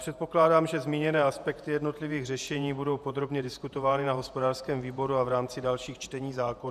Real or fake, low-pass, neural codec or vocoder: fake; 14.4 kHz; vocoder, 48 kHz, 128 mel bands, Vocos